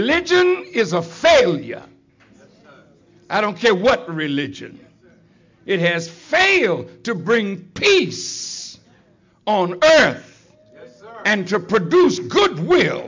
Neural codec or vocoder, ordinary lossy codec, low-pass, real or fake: none; MP3, 64 kbps; 7.2 kHz; real